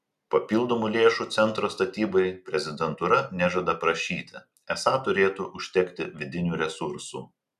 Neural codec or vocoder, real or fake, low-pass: none; real; 14.4 kHz